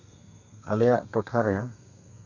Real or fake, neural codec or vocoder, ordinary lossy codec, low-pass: fake; codec, 32 kHz, 1.9 kbps, SNAC; none; 7.2 kHz